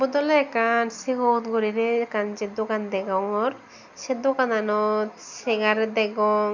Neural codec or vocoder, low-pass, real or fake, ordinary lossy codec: none; 7.2 kHz; real; none